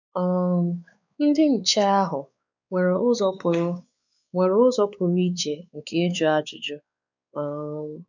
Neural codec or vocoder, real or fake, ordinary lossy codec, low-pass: codec, 16 kHz, 4 kbps, X-Codec, WavLM features, trained on Multilingual LibriSpeech; fake; none; 7.2 kHz